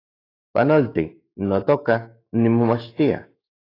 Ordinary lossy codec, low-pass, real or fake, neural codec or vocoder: AAC, 24 kbps; 5.4 kHz; fake; autoencoder, 48 kHz, 128 numbers a frame, DAC-VAE, trained on Japanese speech